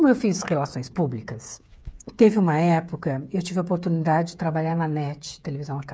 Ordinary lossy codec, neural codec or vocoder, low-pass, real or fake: none; codec, 16 kHz, 8 kbps, FreqCodec, smaller model; none; fake